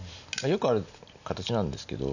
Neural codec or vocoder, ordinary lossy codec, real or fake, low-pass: none; none; real; 7.2 kHz